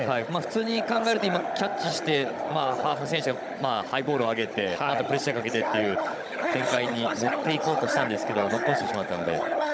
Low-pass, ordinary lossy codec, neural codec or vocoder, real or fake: none; none; codec, 16 kHz, 16 kbps, FunCodec, trained on Chinese and English, 50 frames a second; fake